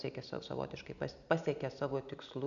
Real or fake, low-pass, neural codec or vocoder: real; 7.2 kHz; none